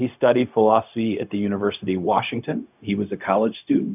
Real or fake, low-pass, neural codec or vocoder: fake; 3.6 kHz; codec, 16 kHz, 0.4 kbps, LongCat-Audio-Codec